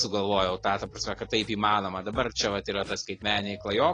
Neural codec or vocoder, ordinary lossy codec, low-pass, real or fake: none; AAC, 32 kbps; 9.9 kHz; real